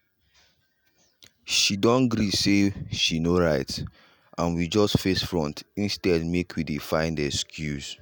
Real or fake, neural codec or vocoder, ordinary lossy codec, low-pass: real; none; none; none